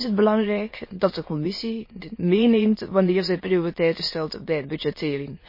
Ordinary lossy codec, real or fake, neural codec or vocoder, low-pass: MP3, 24 kbps; fake; autoencoder, 22.05 kHz, a latent of 192 numbers a frame, VITS, trained on many speakers; 5.4 kHz